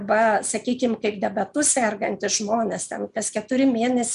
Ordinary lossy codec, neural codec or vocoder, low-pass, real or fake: MP3, 96 kbps; none; 10.8 kHz; real